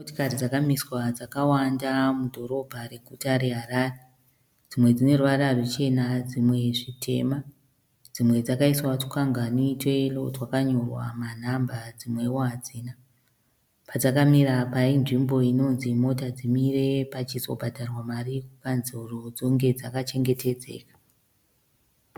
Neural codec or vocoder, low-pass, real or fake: none; 19.8 kHz; real